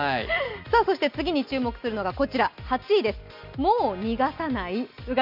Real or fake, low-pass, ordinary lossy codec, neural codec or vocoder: real; 5.4 kHz; none; none